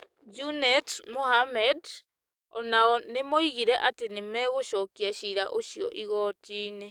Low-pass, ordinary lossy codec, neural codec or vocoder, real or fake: 19.8 kHz; none; codec, 44.1 kHz, 7.8 kbps, DAC; fake